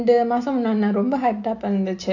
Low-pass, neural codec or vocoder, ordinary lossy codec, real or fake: 7.2 kHz; none; AAC, 48 kbps; real